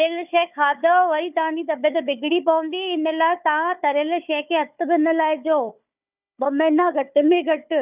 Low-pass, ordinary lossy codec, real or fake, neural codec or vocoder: 3.6 kHz; none; fake; codec, 16 kHz, 4 kbps, FunCodec, trained on Chinese and English, 50 frames a second